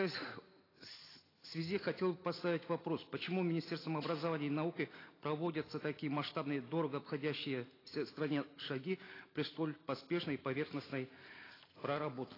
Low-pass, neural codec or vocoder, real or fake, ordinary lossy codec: 5.4 kHz; none; real; AAC, 24 kbps